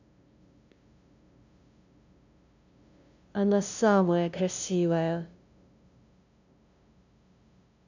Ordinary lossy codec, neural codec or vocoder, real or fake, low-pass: none; codec, 16 kHz, 0.5 kbps, FunCodec, trained on LibriTTS, 25 frames a second; fake; 7.2 kHz